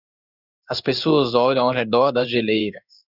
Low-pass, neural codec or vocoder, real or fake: 5.4 kHz; codec, 16 kHz in and 24 kHz out, 1 kbps, XY-Tokenizer; fake